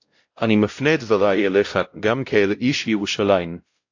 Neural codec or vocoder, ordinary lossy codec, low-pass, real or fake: codec, 16 kHz, 0.5 kbps, X-Codec, WavLM features, trained on Multilingual LibriSpeech; AAC, 48 kbps; 7.2 kHz; fake